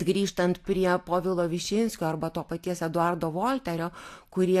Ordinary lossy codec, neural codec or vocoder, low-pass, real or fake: AAC, 64 kbps; none; 14.4 kHz; real